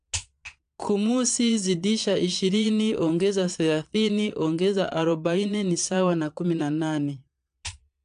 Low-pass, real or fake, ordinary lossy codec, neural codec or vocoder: 9.9 kHz; fake; AAC, 64 kbps; vocoder, 22.05 kHz, 80 mel bands, Vocos